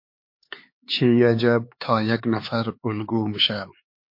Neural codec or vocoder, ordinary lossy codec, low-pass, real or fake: codec, 16 kHz, 4 kbps, X-Codec, HuBERT features, trained on LibriSpeech; MP3, 32 kbps; 5.4 kHz; fake